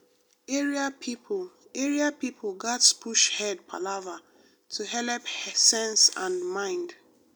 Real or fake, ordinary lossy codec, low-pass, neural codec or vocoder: real; none; none; none